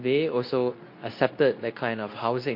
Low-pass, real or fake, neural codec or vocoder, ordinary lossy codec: 5.4 kHz; fake; codec, 24 kHz, 0.9 kbps, WavTokenizer, medium speech release version 2; MP3, 32 kbps